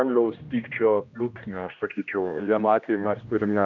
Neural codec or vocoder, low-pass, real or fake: codec, 16 kHz, 1 kbps, X-Codec, HuBERT features, trained on general audio; 7.2 kHz; fake